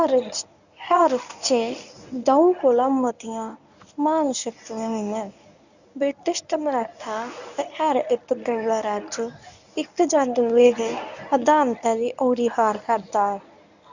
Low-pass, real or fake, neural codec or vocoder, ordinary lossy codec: 7.2 kHz; fake; codec, 24 kHz, 0.9 kbps, WavTokenizer, medium speech release version 1; none